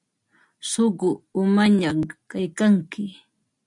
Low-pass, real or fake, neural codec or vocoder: 10.8 kHz; real; none